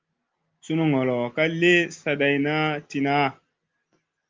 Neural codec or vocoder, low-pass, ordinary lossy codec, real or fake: none; 7.2 kHz; Opus, 32 kbps; real